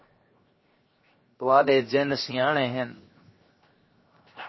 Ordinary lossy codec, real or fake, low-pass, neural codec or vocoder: MP3, 24 kbps; fake; 7.2 kHz; codec, 16 kHz, 0.7 kbps, FocalCodec